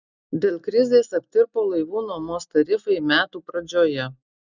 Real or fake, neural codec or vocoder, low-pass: real; none; 7.2 kHz